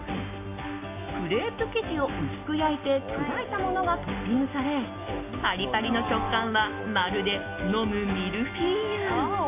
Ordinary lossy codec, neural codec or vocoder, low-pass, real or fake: AAC, 24 kbps; none; 3.6 kHz; real